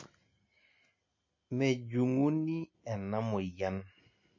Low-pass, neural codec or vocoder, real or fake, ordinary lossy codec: 7.2 kHz; none; real; MP3, 32 kbps